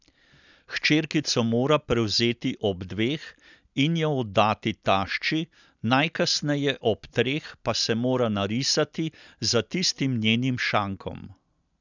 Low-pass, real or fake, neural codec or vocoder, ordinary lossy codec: 7.2 kHz; real; none; none